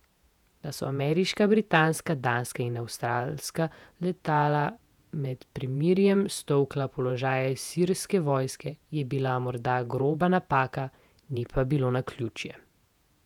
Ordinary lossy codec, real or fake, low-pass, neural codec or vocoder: none; fake; 19.8 kHz; vocoder, 48 kHz, 128 mel bands, Vocos